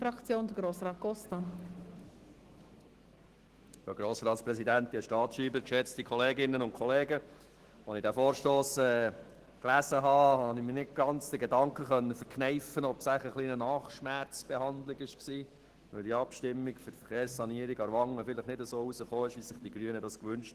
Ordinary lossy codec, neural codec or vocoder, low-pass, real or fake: Opus, 16 kbps; autoencoder, 48 kHz, 128 numbers a frame, DAC-VAE, trained on Japanese speech; 14.4 kHz; fake